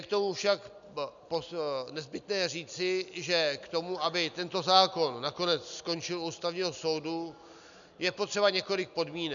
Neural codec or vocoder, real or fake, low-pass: none; real; 7.2 kHz